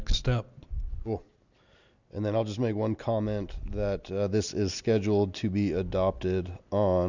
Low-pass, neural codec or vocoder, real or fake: 7.2 kHz; none; real